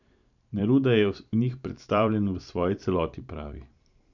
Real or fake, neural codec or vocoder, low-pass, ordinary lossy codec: fake; vocoder, 44.1 kHz, 128 mel bands every 512 samples, BigVGAN v2; 7.2 kHz; none